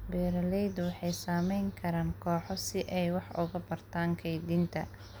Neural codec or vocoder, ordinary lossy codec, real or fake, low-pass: none; none; real; none